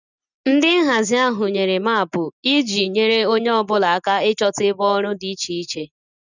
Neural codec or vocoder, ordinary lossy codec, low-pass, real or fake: none; none; 7.2 kHz; real